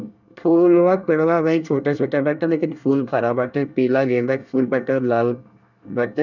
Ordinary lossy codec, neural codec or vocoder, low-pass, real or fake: none; codec, 24 kHz, 1 kbps, SNAC; 7.2 kHz; fake